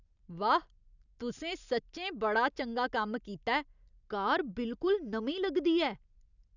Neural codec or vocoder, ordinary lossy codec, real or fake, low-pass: none; none; real; 7.2 kHz